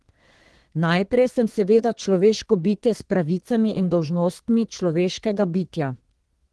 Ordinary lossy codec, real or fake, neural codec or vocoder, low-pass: Opus, 16 kbps; fake; codec, 32 kHz, 1.9 kbps, SNAC; 10.8 kHz